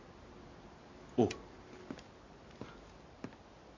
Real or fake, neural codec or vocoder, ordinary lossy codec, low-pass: real; none; MP3, 32 kbps; 7.2 kHz